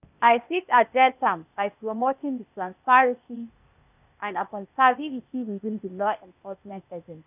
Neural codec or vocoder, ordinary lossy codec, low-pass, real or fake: codec, 16 kHz, 0.8 kbps, ZipCodec; none; 3.6 kHz; fake